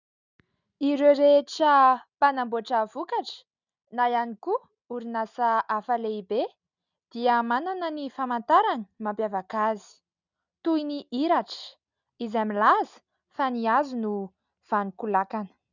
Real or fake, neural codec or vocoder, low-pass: real; none; 7.2 kHz